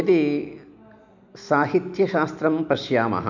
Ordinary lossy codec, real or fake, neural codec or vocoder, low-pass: none; real; none; 7.2 kHz